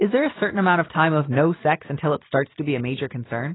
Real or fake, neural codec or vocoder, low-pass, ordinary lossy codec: real; none; 7.2 kHz; AAC, 16 kbps